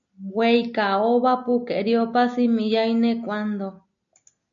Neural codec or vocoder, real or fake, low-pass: none; real; 7.2 kHz